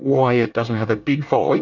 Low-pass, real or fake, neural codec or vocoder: 7.2 kHz; fake; codec, 24 kHz, 1 kbps, SNAC